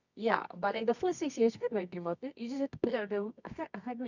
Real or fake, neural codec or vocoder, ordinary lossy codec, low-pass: fake; codec, 24 kHz, 0.9 kbps, WavTokenizer, medium music audio release; none; 7.2 kHz